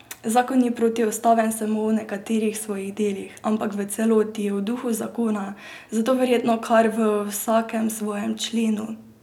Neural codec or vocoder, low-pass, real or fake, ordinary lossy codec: none; 19.8 kHz; real; none